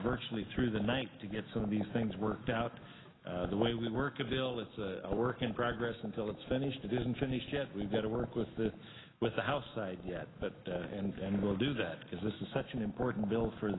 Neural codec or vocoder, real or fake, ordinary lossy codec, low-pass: autoencoder, 48 kHz, 128 numbers a frame, DAC-VAE, trained on Japanese speech; fake; AAC, 16 kbps; 7.2 kHz